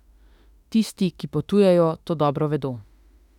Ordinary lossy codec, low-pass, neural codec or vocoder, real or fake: none; 19.8 kHz; autoencoder, 48 kHz, 32 numbers a frame, DAC-VAE, trained on Japanese speech; fake